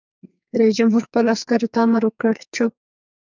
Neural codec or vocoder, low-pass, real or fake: codec, 44.1 kHz, 2.6 kbps, SNAC; 7.2 kHz; fake